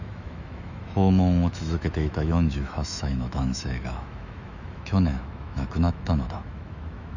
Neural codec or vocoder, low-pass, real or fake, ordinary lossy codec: autoencoder, 48 kHz, 128 numbers a frame, DAC-VAE, trained on Japanese speech; 7.2 kHz; fake; none